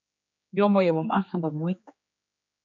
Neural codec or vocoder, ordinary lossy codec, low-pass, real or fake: codec, 16 kHz, 2 kbps, X-Codec, HuBERT features, trained on general audio; MP3, 48 kbps; 7.2 kHz; fake